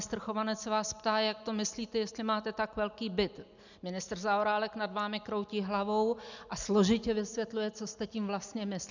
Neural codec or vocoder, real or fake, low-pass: none; real; 7.2 kHz